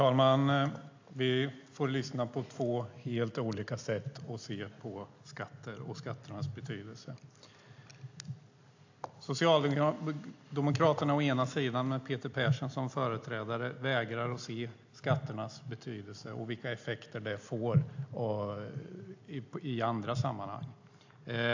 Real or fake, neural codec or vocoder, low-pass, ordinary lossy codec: real; none; 7.2 kHz; AAC, 48 kbps